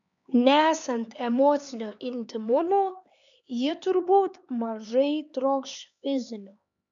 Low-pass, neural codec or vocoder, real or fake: 7.2 kHz; codec, 16 kHz, 4 kbps, X-Codec, HuBERT features, trained on LibriSpeech; fake